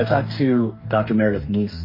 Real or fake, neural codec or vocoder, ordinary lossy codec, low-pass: fake; codec, 44.1 kHz, 2.6 kbps, DAC; MP3, 24 kbps; 5.4 kHz